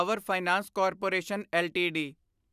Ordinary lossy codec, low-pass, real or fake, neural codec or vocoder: none; 14.4 kHz; real; none